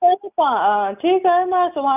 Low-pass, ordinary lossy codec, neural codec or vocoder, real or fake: 3.6 kHz; none; none; real